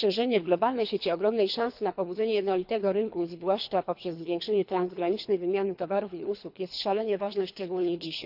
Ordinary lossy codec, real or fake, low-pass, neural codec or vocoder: none; fake; 5.4 kHz; codec, 24 kHz, 3 kbps, HILCodec